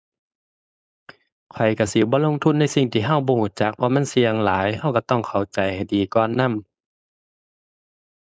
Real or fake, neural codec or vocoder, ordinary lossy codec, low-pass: fake; codec, 16 kHz, 4.8 kbps, FACodec; none; none